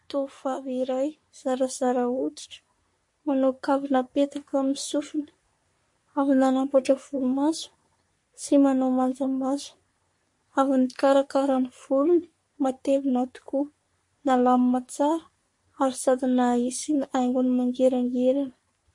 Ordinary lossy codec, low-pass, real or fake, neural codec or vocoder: MP3, 48 kbps; 10.8 kHz; fake; codec, 44.1 kHz, 3.4 kbps, Pupu-Codec